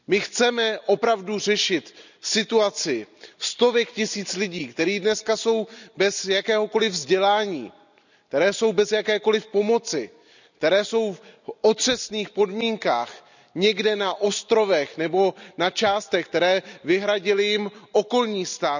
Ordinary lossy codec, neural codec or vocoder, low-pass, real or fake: none; none; 7.2 kHz; real